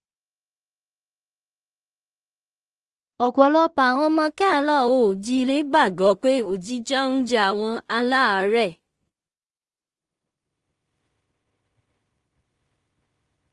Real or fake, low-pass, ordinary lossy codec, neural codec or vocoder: fake; 10.8 kHz; Opus, 24 kbps; codec, 16 kHz in and 24 kHz out, 0.4 kbps, LongCat-Audio-Codec, two codebook decoder